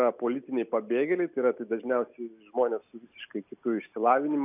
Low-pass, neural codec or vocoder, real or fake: 3.6 kHz; none; real